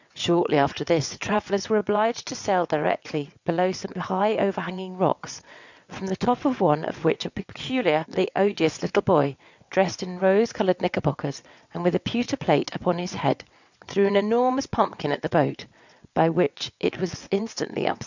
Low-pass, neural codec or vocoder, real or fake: 7.2 kHz; vocoder, 22.05 kHz, 80 mel bands, WaveNeXt; fake